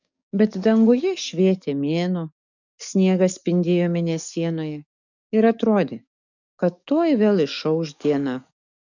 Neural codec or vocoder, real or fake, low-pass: codec, 44.1 kHz, 7.8 kbps, DAC; fake; 7.2 kHz